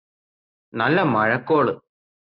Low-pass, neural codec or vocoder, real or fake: 5.4 kHz; none; real